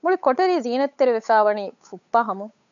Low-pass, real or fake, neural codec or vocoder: 7.2 kHz; fake; codec, 16 kHz, 8 kbps, FunCodec, trained on Chinese and English, 25 frames a second